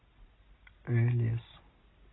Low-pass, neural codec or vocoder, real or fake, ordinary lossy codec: 7.2 kHz; none; real; AAC, 16 kbps